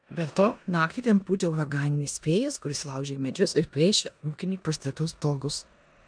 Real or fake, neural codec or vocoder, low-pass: fake; codec, 16 kHz in and 24 kHz out, 0.9 kbps, LongCat-Audio-Codec, four codebook decoder; 9.9 kHz